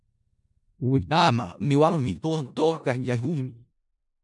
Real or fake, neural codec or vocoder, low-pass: fake; codec, 16 kHz in and 24 kHz out, 0.4 kbps, LongCat-Audio-Codec, four codebook decoder; 10.8 kHz